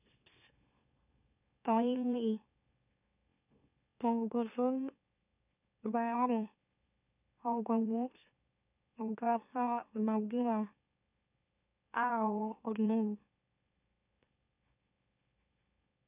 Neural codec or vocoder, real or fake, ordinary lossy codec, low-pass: autoencoder, 44.1 kHz, a latent of 192 numbers a frame, MeloTTS; fake; none; 3.6 kHz